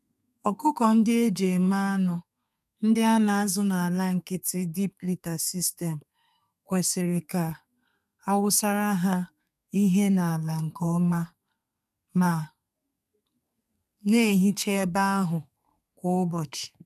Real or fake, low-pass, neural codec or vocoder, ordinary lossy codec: fake; 14.4 kHz; codec, 32 kHz, 1.9 kbps, SNAC; none